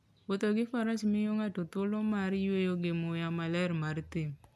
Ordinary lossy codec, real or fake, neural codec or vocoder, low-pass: none; real; none; none